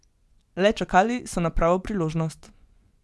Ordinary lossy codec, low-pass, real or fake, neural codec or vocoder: none; none; fake; vocoder, 24 kHz, 100 mel bands, Vocos